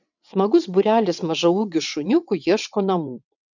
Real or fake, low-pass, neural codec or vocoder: fake; 7.2 kHz; vocoder, 22.05 kHz, 80 mel bands, WaveNeXt